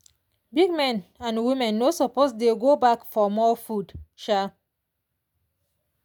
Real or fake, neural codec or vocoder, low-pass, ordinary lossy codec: real; none; none; none